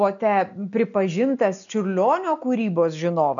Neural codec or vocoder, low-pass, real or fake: none; 7.2 kHz; real